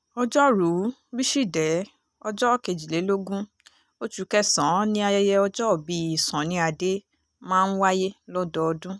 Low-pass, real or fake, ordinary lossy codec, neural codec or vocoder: none; real; none; none